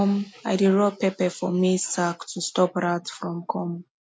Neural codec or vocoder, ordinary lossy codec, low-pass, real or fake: none; none; none; real